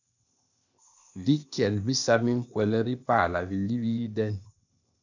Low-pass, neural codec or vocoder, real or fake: 7.2 kHz; codec, 16 kHz, 0.8 kbps, ZipCodec; fake